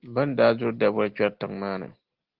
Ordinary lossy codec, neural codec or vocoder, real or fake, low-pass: Opus, 16 kbps; none; real; 5.4 kHz